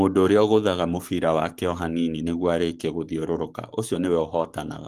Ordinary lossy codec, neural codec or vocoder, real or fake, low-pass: Opus, 24 kbps; codec, 44.1 kHz, 7.8 kbps, Pupu-Codec; fake; 14.4 kHz